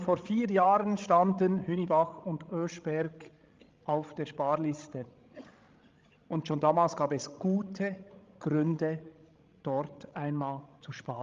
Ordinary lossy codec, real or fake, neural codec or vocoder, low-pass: Opus, 32 kbps; fake; codec, 16 kHz, 16 kbps, FreqCodec, larger model; 7.2 kHz